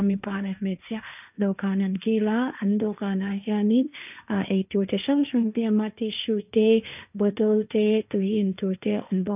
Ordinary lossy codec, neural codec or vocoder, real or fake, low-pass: none; codec, 16 kHz, 1.1 kbps, Voila-Tokenizer; fake; 3.6 kHz